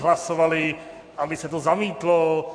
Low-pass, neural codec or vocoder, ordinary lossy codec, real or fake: 9.9 kHz; codec, 44.1 kHz, 7.8 kbps, Pupu-Codec; AAC, 64 kbps; fake